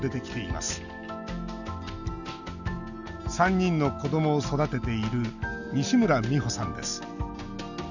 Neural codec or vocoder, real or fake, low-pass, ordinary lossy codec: none; real; 7.2 kHz; none